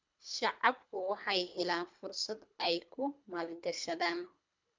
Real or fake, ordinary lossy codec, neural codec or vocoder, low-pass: fake; MP3, 64 kbps; codec, 24 kHz, 3 kbps, HILCodec; 7.2 kHz